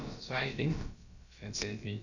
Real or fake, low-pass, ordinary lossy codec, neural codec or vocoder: fake; 7.2 kHz; none; codec, 16 kHz, about 1 kbps, DyCAST, with the encoder's durations